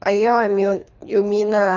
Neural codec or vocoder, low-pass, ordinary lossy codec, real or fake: codec, 24 kHz, 3 kbps, HILCodec; 7.2 kHz; none; fake